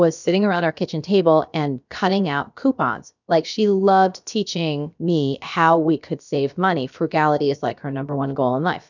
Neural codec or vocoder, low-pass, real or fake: codec, 16 kHz, about 1 kbps, DyCAST, with the encoder's durations; 7.2 kHz; fake